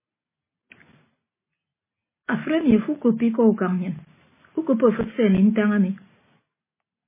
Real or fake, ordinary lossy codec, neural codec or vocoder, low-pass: real; MP3, 16 kbps; none; 3.6 kHz